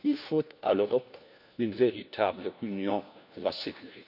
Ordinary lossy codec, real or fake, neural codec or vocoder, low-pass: none; fake; codec, 16 kHz, 1 kbps, FunCodec, trained on LibriTTS, 50 frames a second; 5.4 kHz